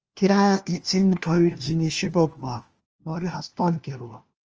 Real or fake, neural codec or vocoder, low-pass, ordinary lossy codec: fake; codec, 16 kHz, 1 kbps, FunCodec, trained on LibriTTS, 50 frames a second; 7.2 kHz; Opus, 24 kbps